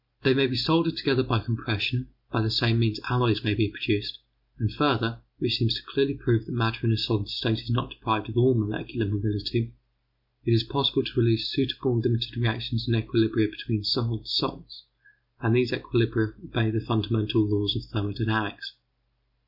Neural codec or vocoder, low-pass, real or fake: none; 5.4 kHz; real